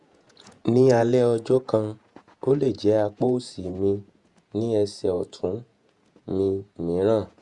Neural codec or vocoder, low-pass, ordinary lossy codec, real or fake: vocoder, 48 kHz, 128 mel bands, Vocos; 10.8 kHz; Opus, 64 kbps; fake